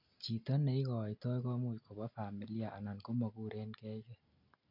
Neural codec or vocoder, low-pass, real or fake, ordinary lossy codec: none; 5.4 kHz; real; none